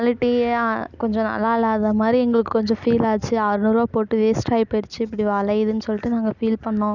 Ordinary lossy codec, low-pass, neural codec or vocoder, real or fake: none; 7.2 kHz; none; real